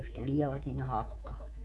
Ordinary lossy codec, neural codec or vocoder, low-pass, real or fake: none; codec, 24 kHz, 3.1 kbps, DualCodec; none; fake